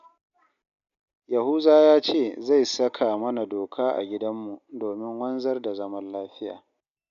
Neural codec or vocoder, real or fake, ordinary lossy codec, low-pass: none; real; none; 7.2 kHz